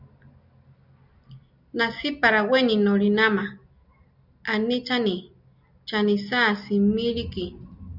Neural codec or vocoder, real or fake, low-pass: none; real; 5.4 kHz